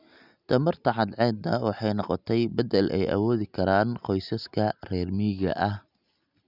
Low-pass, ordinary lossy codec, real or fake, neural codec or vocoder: 5.4 kHz; none; real; none